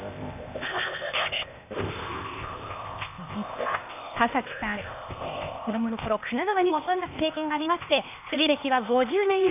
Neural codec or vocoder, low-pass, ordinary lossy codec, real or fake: codec, 16 kHz, 0.8 kbps, ZipCodec; 3.6 kHz; none; fake